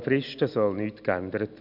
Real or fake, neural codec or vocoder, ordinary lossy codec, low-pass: real; none; none; 5.4 kHz